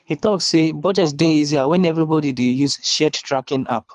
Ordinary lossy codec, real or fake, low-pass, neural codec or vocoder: none; fake; 10.8 kHz; codec, 24 kHz, 3 kbps, HILCodec